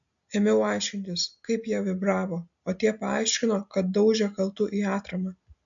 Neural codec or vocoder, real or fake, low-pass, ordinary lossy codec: none; real; 7.2 kHz; MP3, 64 kbps